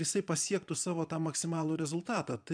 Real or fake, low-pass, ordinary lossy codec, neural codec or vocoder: fake; 9.9 kHz; MP3, 96 kbps; vocoder, 22.05 kHz, 80 mel bands, Vocos